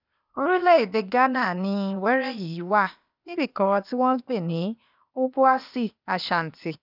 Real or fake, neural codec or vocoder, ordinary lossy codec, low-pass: fake; codec, 16 kHz, 0.8 kbps, ZipCodec; none; 5.4 kHz